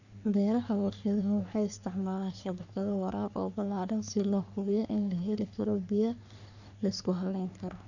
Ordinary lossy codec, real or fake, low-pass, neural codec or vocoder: none; fake; 7.2 kHz; codec, 44.1 kHz, 3.4 kbps, Pupu-Codec